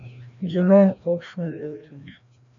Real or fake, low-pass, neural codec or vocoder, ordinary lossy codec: fake; 7.2 kHz; codec, 16 kHz, 1 kbps, FreqCodec, larger model; MP3, 64 kbps